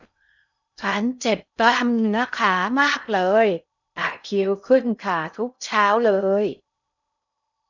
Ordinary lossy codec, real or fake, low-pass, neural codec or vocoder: none; fake; 7.2 kHz; codec, 16 kHz in and 24 kHz out, 0.6 kbps, FocalCodec, streaming, 2048 codes